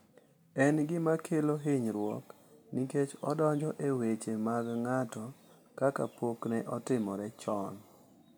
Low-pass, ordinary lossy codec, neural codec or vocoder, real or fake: none; none; none; real